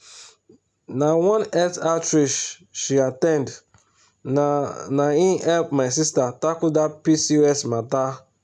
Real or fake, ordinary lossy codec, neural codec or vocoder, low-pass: real; none; none; none